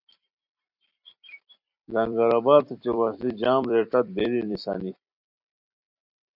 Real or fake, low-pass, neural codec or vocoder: fake; 5.4 kHz; vocoder, 44.1 kHz, 128 mel bands every 256 samples, BigVGAN v2